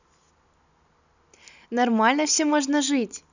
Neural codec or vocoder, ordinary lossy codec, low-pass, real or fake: none; none; 7.2 kHz; real